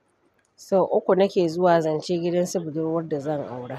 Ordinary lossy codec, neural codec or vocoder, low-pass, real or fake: none; none; 14.4 kHz; real